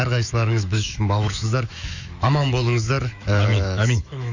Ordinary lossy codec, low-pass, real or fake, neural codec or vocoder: Opus, 64 kbps; 7.2 kHz; fake; autoencoder, 48 kHz, 128 numbers a frame, DAC-VAE, trained on Japanese speech